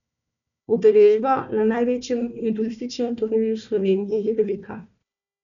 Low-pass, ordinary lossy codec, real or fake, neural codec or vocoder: 7.2 kHz; none; fake; codec, 16 kHz, 1 kbps, FunCodec, trained on Chinese and English, 50 frames a second